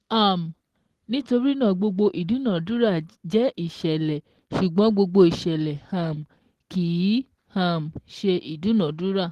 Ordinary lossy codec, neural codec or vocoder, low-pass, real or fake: Opus, 16 kbps; none; 14.4 kHz; real